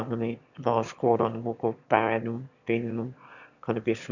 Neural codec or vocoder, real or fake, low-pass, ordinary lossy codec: autoencoder, 22.05 kHz, a latent of 192 numbers a frame, VITS, trained on one speaker; fake; 7.2 kHz; none